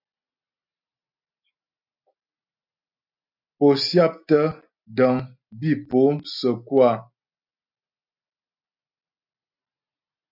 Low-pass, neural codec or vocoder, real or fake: 5.4 kHz; none; real